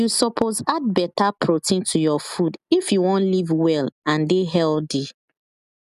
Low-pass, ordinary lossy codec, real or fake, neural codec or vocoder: 14.4 kHz; none; real; none